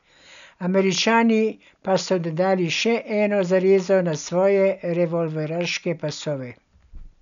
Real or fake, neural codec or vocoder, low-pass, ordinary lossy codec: real; none; 7.2 kHz; none